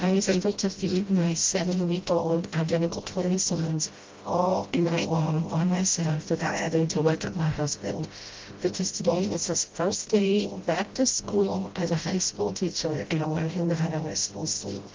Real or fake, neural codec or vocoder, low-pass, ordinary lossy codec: fake; codec, 16 kHz, 0.5 kbps, FreqCodec, smaller model; 7.2 kHz; Opus, 32 kbps